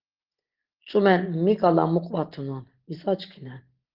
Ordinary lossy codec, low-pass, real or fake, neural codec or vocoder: Opus, 16 kbps; 5.4 kHz; real; none